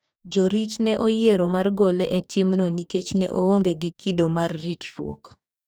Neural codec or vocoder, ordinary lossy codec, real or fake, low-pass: codec, 44.1 kHz, 2.6 kbps, DAC; none; fake; none